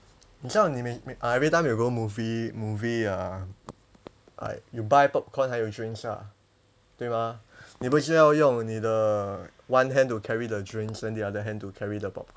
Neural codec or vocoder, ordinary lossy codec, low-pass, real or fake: none; none; none; real